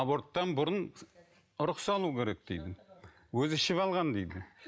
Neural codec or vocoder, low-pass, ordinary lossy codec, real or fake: none; none; none; real